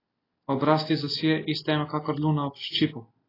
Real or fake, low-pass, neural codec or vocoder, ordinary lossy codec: real; 5.4 kHz; none; AAC, 24 kbps